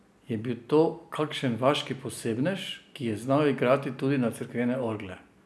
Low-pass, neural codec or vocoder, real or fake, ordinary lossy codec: none; none; real; none